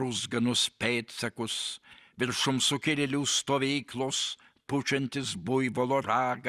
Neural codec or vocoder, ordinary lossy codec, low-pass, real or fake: none; Opus, 64 kbps; 14.4 kHz; real